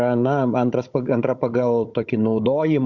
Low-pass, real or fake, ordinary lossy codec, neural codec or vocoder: 7.2 kHz; fake; Opus, 64 kbps; codec, 16 kHz, 16 kbps, FunCodec, trained on Chinese and English, 50 frames a second